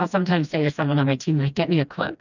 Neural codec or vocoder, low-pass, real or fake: codec, 16 kHz, 1 kbps, FreqCodec, smaller model; 7.2 kHz; fake